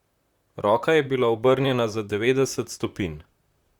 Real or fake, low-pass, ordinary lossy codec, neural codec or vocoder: fake; 19.8 kHz; Opus, 64 kbps; vocoder, 44.1 kHz, 128 mel bands, Pupu-Vocoder